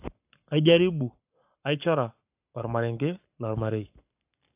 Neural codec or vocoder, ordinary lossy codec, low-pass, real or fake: codec, 44.1 kHz, 7.8 kbps, Pupu-Codec; AAC, 32 kbps; 3.6 kHz; fake